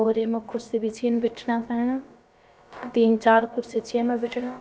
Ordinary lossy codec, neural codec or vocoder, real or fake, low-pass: none; codec, 16 kHz, about 1 kbps, DyCAST, with the encoder's durations; fake; none